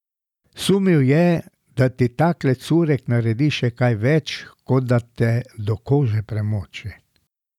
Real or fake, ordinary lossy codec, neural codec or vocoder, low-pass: real; none; none; 19.8 kHz